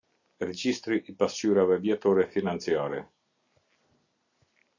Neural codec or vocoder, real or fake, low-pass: none; real; 7.2 kHz